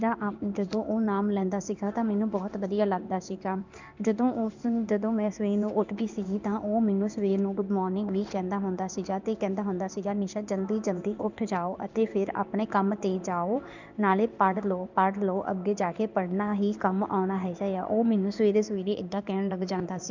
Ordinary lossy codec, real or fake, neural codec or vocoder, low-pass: none; fake; codec, 16 kHz in and 24 kHz out, 1 kbps, XY-Tokenizer; 7.2 kHz